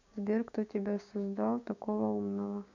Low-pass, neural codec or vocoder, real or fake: 7.2 kHz; codec, 16 kHz, 6 kbps, DAC; fake